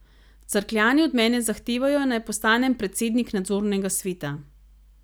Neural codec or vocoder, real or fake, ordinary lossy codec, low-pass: none; real; none; none